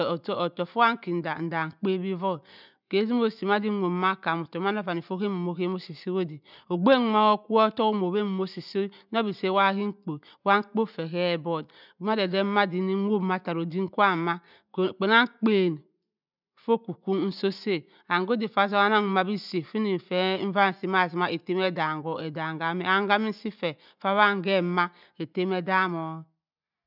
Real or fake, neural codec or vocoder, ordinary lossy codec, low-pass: real; none; none; 5.4 kHz